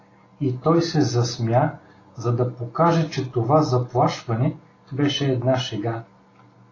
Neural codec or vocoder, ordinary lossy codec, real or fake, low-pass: none; AAC, 32 kbps; real; 7.2 kHz